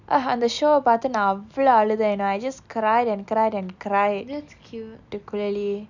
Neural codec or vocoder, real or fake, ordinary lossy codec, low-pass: none; real; none; 7.2 kHz